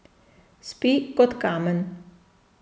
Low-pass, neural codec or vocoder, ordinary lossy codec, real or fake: none; none; none; real